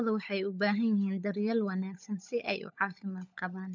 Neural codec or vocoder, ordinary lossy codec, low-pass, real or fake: codec, 16 kHz, 16 kbps, FunCodec, trained on LibriTTS, 50 frames a second; none; 7.2 kHz; fake